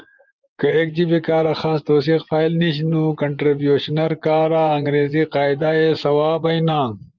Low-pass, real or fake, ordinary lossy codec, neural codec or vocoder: 7.2 kHz; fake; Opus, 24 kbps; vocoder, 24 kHz, 100 mel bands, Vocos